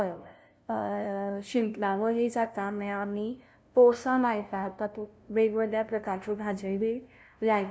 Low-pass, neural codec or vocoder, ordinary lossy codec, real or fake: none; codec, 16 kHz, 0.5 kbps, FunCodec, trained on LibriTTS, 25 frames a second; none; fake